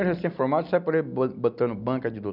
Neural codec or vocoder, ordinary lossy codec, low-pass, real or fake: none; none; 5.4 kHz; real